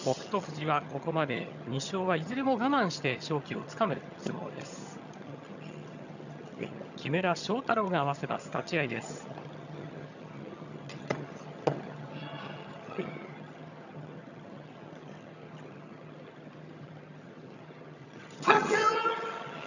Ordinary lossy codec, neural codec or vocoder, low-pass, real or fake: none; vocoder, 22.05 kHz, 80 mel bands, HiFi-GAN; 7.2 kHz; fake